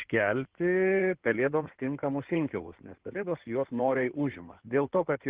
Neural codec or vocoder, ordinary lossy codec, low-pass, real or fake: codec, 16 kHz in and 24 kHz out, 2.2 kbps, FireRedTTS-2 codec; Opus, 16 kbps; 3.6 kHz; fake